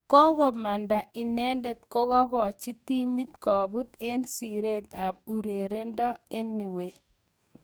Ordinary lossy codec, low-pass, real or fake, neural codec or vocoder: none; none; fake; codec, 44.1 kHz, 2.6 kbps, DAC